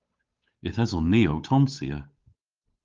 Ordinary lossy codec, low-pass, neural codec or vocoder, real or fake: Opus, 32 kbps; 7.2 kHz; codec, 16 kHz, 8 kbps, FunCodec, trained on Chinese and English, 25 frames a second; fake